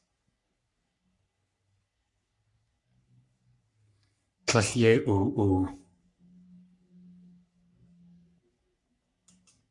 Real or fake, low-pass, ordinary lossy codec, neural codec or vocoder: fake; 10.8 kHz; MP3, 96 kbps; codec, 44.1 kHz, 3.4 kbps, Pupu-Codec